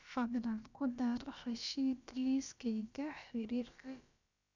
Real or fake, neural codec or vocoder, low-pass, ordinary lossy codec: fake; codec, 16 kHz, about 1 kbps, DyCAST, with the encoder's durations; 7.2 kHz; none